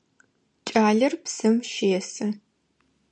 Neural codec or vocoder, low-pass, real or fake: none; 9.9 kHz; real